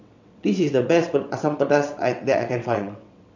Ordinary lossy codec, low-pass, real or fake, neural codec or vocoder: none; 7.2 kHz; fake; vocoder, 22.05 kHz, 80 mel bands, WaveNeXt